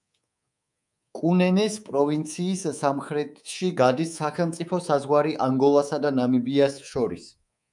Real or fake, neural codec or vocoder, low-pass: fake; codec, 24 kHz, 3.1 kbps, DualCodec; 10.8 kHz